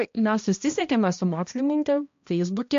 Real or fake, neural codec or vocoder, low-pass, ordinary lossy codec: fake; codec, 16 kHz, 1 kbps, X-Codec, HuBERT features, trained on balanced general audio; 7.2 kHz; MP3, 48 kbps